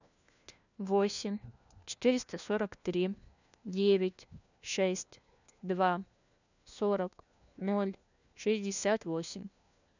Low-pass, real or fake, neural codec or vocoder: 7.2 kHz; fake; codec, 16 kHz, 1 kbps, FunCodec, trained on LibriTTS, 50 frames a second